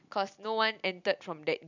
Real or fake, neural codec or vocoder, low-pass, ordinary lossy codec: real; none; 7.2 kHz; none